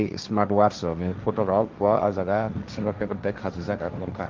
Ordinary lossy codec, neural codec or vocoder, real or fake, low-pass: Opus, 32 kbps; codec, 16 kHz, 1.1 kbps, Voila-Tokenizer; fake; 7.2 kHz